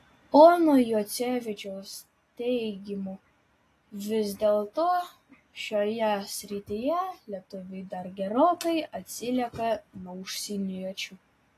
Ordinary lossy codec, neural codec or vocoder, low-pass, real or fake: AAC, 48 kbps; none; 14.4 kHz; real